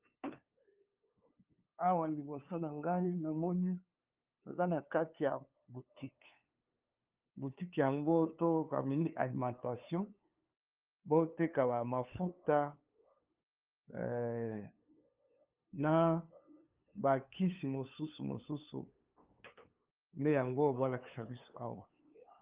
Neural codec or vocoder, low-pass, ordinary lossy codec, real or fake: codec, 16 kHz, 2 kbps, FunCodec, trained on LibriTTS, 25 frames a second; 3.6 kHz; Opus, 32 kbps; fake